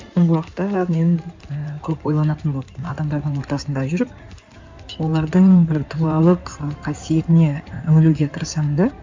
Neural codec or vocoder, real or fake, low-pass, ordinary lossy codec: codec, 16 kHz in and 24 kHz out, 2.2 kbps, FireRedTTS-2 codec; fake; 7.2 kHz; MP3, 64 kbps